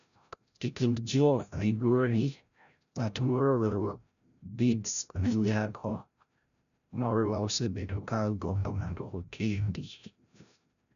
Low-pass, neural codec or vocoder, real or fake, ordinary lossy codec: 7.2 kHz; codec, 16 kHz, 0.5 kbps, FreqCodec, larger model; fake; none